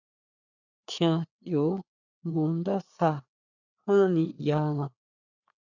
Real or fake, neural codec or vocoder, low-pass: fake; codec, 16 kHz, 4 kbps, FreqCodec, larger model; 7.2 kHz